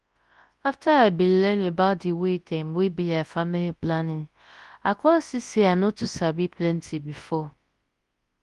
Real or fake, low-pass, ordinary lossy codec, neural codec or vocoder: fake; 10.8 kHz; Opus, 24 kbps; codec, 24 kHz, 0.9 kbps, WavTokenizer, large speech release